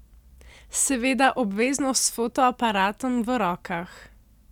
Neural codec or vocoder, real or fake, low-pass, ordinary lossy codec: none; real; 19.8 kHz; none